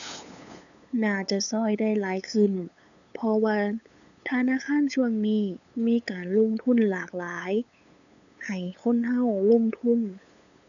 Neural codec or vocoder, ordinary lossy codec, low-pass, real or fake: codec, 16 kHz, 8 kbps, FunCodec, trained on LibriTTS, 25 frames a second; none; 7.2 kHz; fake